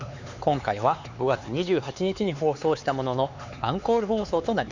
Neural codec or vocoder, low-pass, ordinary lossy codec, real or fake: codec, 16 kHz, 4 kbps, X-Codec, HuBERT features, trained on LibriSpeech; 7.2 kHz; Opus, 64 kbps; fake